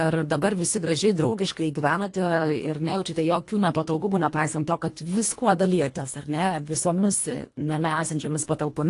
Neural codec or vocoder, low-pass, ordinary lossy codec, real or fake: codec, 24 kHz, 1.5 kbps, HILCodec; 10.8 kHz; AAC, 48 kbps; fake